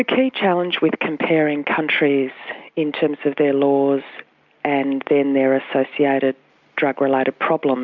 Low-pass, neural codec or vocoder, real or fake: 7.2 kHz; none; real